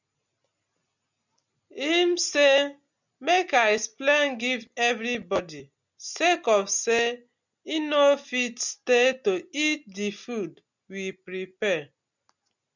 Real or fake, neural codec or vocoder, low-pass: real; none; 7.2 kHz